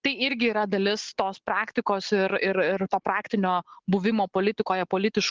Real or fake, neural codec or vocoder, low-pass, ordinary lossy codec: real; none; 7.2 kHz; Opus, 16 kbps